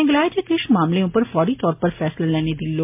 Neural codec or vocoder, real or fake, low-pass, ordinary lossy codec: none; real; 3.6 kHz; MP3, 32 kbps